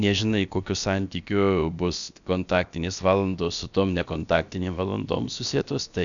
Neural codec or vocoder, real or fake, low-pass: codec, 16 kHz, about 1 kbps, DyCAST, with the encoder's durations; fake; 7.2 kHz